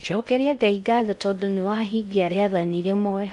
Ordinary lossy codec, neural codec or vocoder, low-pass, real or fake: none; codec, 16 kHz in and 24 kHz out, 0.6 kbps, FocalCodec, streaming, 4096 codes; 10.8 kHz; fake